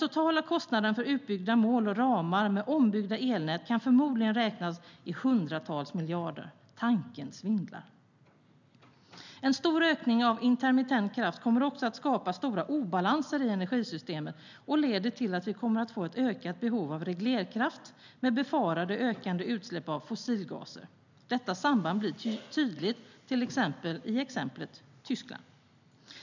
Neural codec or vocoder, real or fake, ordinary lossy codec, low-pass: none; real; none; 7.2 kHz